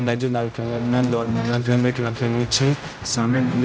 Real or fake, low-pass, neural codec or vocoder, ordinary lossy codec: fake; none; codec, 16 kHz, 0.5 kbps, X-Codec, HuBERT features, trained on general audio; none